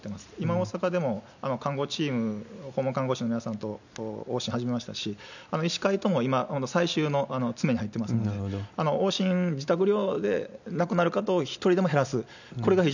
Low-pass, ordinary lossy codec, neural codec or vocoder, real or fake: 7.2 kHz; none; none; real